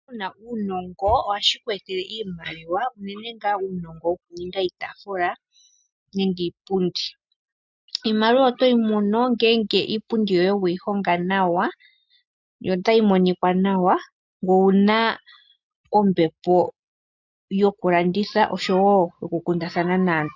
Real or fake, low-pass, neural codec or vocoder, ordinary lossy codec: real; 7.2 kHz; none; MP3, 64 kbps